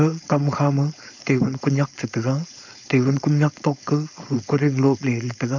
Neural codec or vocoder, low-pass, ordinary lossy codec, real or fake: codec, 16 kHz, 4.8 kbps, FACodec; 7.2 kHz; none; fake